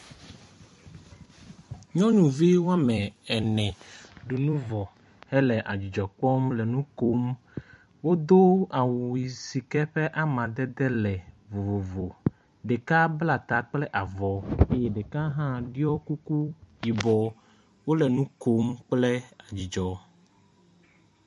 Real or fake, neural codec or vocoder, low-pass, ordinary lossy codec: fake; vocoder, 44.1 kHz, 128 mel bands every 256 samples, BigVGAN v2; 14.4 kHz; MP3, 48 kbps